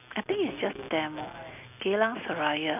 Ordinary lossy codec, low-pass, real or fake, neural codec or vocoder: none; 3.6 kHz; real; none